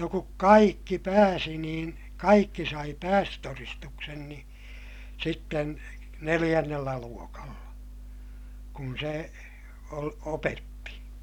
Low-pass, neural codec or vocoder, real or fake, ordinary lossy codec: 19.8 kHz; none; real; none